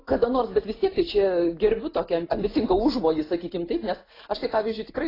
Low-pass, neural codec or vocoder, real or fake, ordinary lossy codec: 5.4 kHz; none; real; AAC, 24 kbps